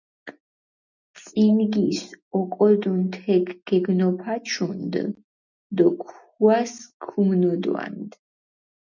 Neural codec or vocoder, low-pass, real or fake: none; 7.2 kHz; real